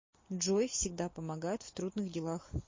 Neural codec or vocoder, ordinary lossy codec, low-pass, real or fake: none; MP3, 32 kbps; 7.2 kHz; real